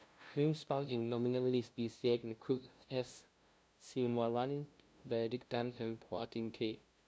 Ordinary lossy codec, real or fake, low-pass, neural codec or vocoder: none; fake; none; codec, 16 kHz, 0.5 kbps, FunCodec, trained on LibriTTS, 25 frames a second